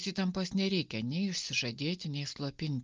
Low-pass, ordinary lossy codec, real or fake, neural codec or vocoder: 7.2 kHz; Opus, 24 kbps; real; none